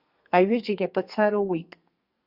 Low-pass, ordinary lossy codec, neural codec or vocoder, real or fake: 5.4 kHz; Opus, 64 kbps; codec, 32 kHz, 1.9 kbps, SNAC; fake